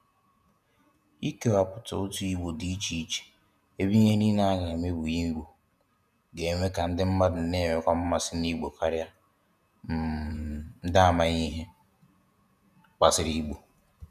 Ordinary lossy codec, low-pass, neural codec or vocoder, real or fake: none; 14.4 kHz; vocoder, 48 kHz, 128 mel bands, Vocos; fake